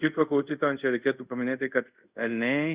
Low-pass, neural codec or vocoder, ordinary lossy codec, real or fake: 3.6 kHz; codec, 24 kHz, 0.5 kbps, DualCodec; Opus, 64 kbps; fake